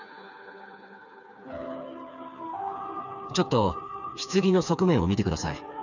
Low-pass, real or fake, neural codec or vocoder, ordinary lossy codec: 7.2 kHz; fake; codec, 16 kHz, 4 kbps, FreqCodec, smaller model; none